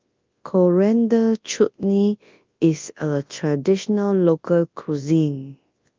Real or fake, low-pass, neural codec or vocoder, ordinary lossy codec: fake; 7.2 kHz; codec, 24 kHz, 0.9 kbps, WavTokenizer, large speech release; Opus, 24 kbps